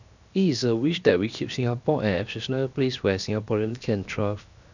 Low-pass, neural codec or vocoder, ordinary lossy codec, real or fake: 7.2 kHz; codec, 16 kHz, 0.7 kbps, FocalCodec; none; fake